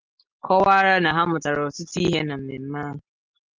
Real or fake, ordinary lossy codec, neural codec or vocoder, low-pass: real; Opus, 32 kbps; none; 7.2 kHz